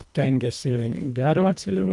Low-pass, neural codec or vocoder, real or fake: 10.8 kHz; codec, 24 kHz, 1.5 kbps, HILCodec; fake